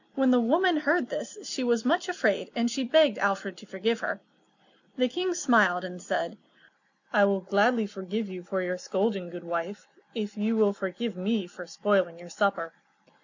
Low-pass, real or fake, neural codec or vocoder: 7.2 kHz; real; none